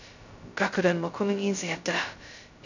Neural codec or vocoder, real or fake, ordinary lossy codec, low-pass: codec, 16 kHz, 0.2 kbps, FocalCodec; fake; none; 7.2 kHz